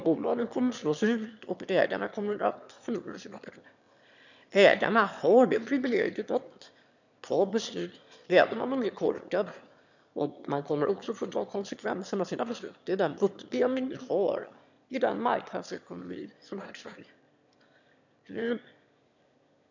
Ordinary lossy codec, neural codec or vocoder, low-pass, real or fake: none; autoencoder, 22.05 kHz, a latent of 192 numbers a frame, VITS, trained on one speaker; 7.2 kHz; fake